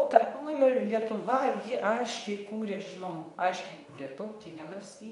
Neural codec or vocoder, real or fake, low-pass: codec, 24 kHz, 0.9 kbps, WavTokenizer, medium speech release version 2; fake; 10.8 kHz